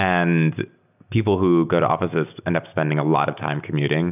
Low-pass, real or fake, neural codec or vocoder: 3.6 kHz; real; none